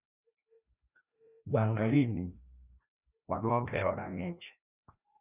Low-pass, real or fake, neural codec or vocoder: 3.6 kHz; fake; codec, 16 kHz, 1 kbps, FreqCodec, larger model